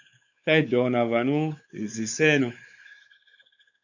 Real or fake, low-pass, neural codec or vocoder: fake; 7.2 kHz; codec, 16 kHz, 4 kbps, X-Codec, WavLM features, trained on Multilingual LibriSpeech